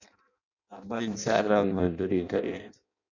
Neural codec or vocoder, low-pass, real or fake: codec, 16 kHz in and 24 kHz out, 0.6 kbps, FireRedTTS-2 codec; 7.2 kHz; fake